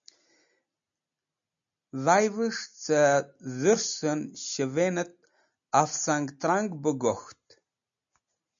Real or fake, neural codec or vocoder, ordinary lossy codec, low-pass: real; none; MP3, 64 kbps; 7.2 kHz